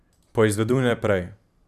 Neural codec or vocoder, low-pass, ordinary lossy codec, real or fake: vocoder, 44.1 kHz, 128 mel bands every 512 samples, BigVGAN v2; 14.4 kHz; none; fake